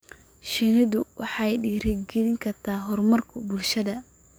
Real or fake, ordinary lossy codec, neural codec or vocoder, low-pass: fake; none; codec, 44.1 kHz, 7.8 kbps, DAC; none